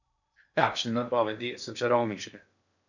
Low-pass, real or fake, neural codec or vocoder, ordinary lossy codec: 7.2 kHz; fake; codec, 16 kHz in and 24 kHz out, 0.8 kbps, FocalCodec, streaming, 65536 codes; none